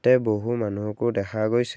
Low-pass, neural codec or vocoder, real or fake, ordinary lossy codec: none; none; real; none